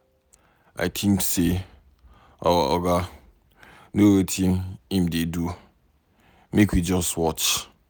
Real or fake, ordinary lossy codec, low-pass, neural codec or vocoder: real; none; none; none